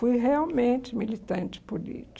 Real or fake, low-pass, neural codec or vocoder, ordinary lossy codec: real; none; none; none